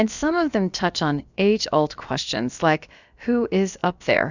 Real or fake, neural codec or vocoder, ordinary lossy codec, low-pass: fake; codec, 16 kHz, about 1 kbps, DyCAST, with the encoder's durations; Opus, 64 kbps; 7.2 kHz